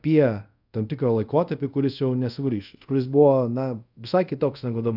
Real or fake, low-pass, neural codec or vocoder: fake; 5.4 kHz; codec, 24 kHz, 0.5 kbps, DualCodec